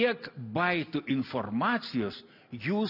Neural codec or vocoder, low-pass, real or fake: none; 5.4 kHz; real